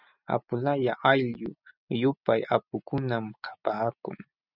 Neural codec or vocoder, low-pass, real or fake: none; 5.4 kHz; real